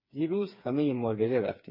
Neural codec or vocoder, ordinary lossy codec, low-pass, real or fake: codec, 16 kHz, 4 kbps, FreqCodec, smaller model; MP3, 24 kbps; 5.4 kHz; fake